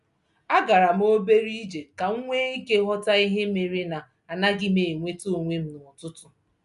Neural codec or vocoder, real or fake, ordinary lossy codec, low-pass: none; real; none; 10.8 kHz